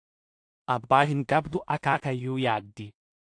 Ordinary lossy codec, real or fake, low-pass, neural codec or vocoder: AAC, 48 kbps; fake; 9.9 kHz; codec, 16 kHz in and 24 kHz out, 0.4 kbps, LongCat-Audio-Codec, two codebook decoder